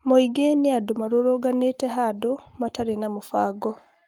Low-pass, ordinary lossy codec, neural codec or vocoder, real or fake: 19.8 kHz; Opus, 32 kbps; codec, 44.1 kHz, 7.8 kbps, Pupu-Codec; fake